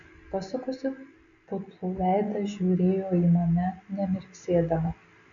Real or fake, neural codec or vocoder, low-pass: real; none; 7.2 kHz